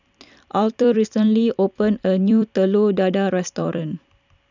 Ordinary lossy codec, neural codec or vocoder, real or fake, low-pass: none; vocoder, 44.1 kHz, 128 mel bands every 256 samples, BigVGAN v2; fake; 7.2 kHz